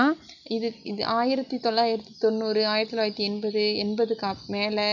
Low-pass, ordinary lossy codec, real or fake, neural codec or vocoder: 7.2 kHz; none; real; none